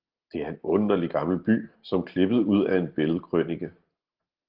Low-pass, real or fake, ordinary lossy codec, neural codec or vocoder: 5.4 kHz; real; Opus, 16 kbps; none